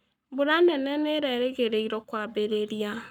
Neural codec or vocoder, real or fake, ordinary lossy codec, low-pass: codec, 44.1 kHz, 7.8 kbps, Pupu-Codec; fake; none; 19.8 kHz